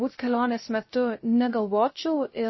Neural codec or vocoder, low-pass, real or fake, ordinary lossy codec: codec, 16 kHz, 0.3 kbps, FocalCodec; 7.2 kHz; fake; MP3, 24 kbps